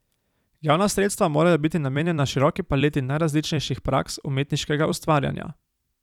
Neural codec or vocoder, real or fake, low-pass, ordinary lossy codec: vocoder, 44.1 kHz, 128 mel bands every 256 samples, BigVGAN v2; fake; 19.8 kHz; none